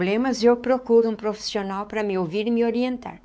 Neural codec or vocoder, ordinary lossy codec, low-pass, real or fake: codec, 16 kHz, 4 kbps, X-Codec, WavLM features, trained on Multilingual LibriSpeech; none; none; fake